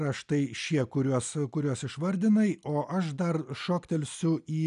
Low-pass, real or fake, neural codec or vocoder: 10.8 kHz; real; none